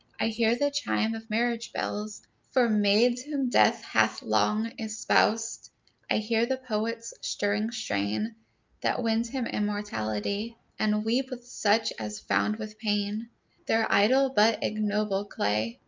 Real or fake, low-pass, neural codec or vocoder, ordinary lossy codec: real; 7.2 kHz; none; Opus, 24 kbps